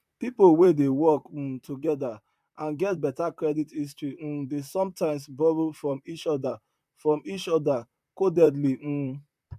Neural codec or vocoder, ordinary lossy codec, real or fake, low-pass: vocoder, 44.1 kHz, 128 mel bands, Pupu-Vocoder; MP3, 96 kbps; fake; 14.4 kHz